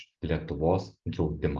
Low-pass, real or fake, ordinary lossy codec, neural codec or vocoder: 7.2 kHz; real; Opus, 32 kbps; none